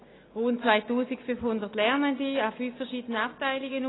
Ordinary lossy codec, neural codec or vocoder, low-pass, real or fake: AAC, 16 kbps; none; 7.2 kHz; real